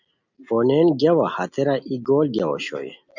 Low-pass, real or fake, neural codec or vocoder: 7.2 kHz; real; none